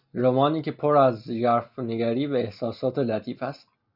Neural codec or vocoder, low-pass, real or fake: none; 5.4 kHz; real